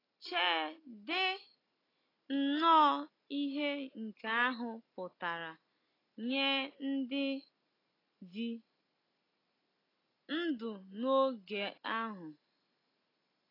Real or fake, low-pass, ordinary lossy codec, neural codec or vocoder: real; 5.4 kHz; AAC, 24 kbps; none